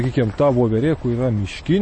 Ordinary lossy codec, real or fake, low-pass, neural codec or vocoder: MP3, 32 kbps; real; 9.9 kHz; none